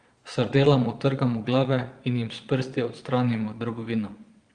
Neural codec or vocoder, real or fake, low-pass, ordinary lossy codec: vocoder, 22.05 kHz, 80 mel bands, Vocos; fake; 9.9 kHz; Opus, 32 kbps